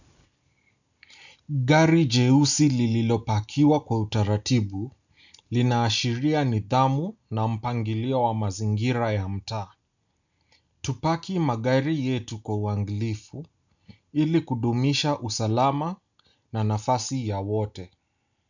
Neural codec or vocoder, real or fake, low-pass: none; real; 7.2 kHz